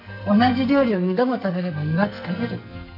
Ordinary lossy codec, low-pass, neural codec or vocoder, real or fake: none; 5.4 kHz; codec, 44.1 kHz, 2.6 kbps, SNAC; fake